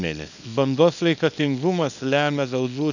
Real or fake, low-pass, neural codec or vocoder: fake; 7.2 kHz; codec, 24 kHz, 0.9 kbps, WavTokenizer, small release